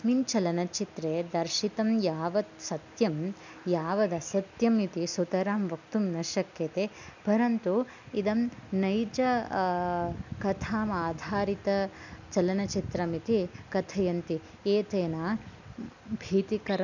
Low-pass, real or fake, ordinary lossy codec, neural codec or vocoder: 7.2 kHz; real; none; none